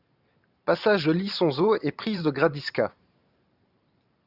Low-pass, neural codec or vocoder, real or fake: 5.4 kHz; vocoder, 44.1 kHz, 128 mel bands every 512 samples, BigVGAN v2; fake